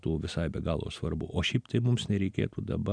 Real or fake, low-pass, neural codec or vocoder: real; 9.9 kHz; none